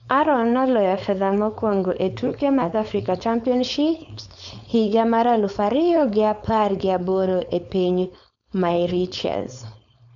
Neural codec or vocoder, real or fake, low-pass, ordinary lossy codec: codec, 16 kHz, 4.8 kbps, FACodec; fake; 7.2 kHz; none